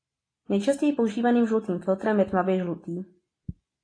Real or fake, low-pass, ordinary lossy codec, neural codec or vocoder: real; 9.9 kHz; AAC, 32 kbps; none